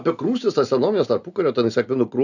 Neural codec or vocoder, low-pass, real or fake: none; 7.2 kHz; real